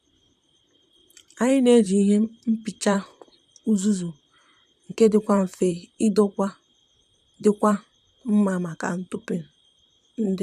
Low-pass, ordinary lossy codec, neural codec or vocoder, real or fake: 14.4 kHz; none; vocoder, 44.1 kHz, 128 mel bands, Pupu-Vocoder; fake